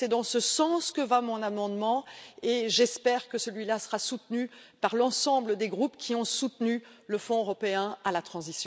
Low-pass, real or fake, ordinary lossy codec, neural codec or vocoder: none; real; none; none